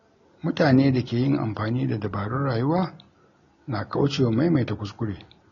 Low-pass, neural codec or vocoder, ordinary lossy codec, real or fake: 7.2 kHz; none; AAC, 32 kbps; real